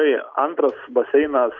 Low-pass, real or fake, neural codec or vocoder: 7.2 kHz; real; none